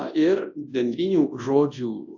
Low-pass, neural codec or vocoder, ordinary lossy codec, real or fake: 7.2 kHz; codec, 24 kHz, 0.9 kbps, WavTokenizer, large speech release; AAC, 48 kbps; fake